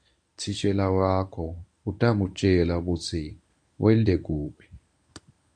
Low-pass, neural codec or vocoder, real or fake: 9.9 kHz; codec, 24 kHz, 0.9 kbps, WavTokenizer, medium speech release version 1; fake